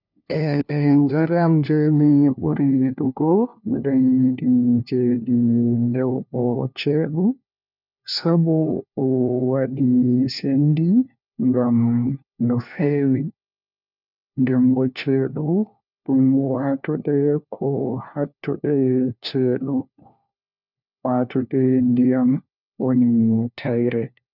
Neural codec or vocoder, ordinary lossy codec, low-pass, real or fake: codec, 16 kHz, 1 kbps, FunCodec, trained on LibriTTS, 50 frames a second; none; 5.4 kHz; fake